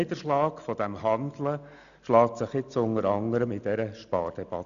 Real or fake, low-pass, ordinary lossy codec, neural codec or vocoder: real; 7.2 kHz; none; none